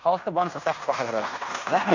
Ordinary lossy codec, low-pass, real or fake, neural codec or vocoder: AAC, 48 kbps; 7.2 kHz; fake; codec, 16 kHz in and 24 kHz out, 0.9 kbps, LongCat-Audio-Codec, fine tuned four codebook decoder